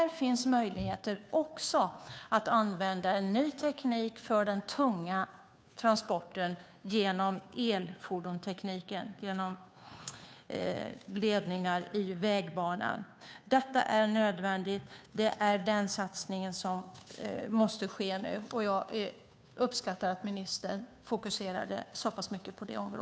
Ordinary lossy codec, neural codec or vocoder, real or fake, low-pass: none; codec, 16 kHz, 2 kbps, FunCodec, trained on Chinese and English, 25 frames a second; fake; none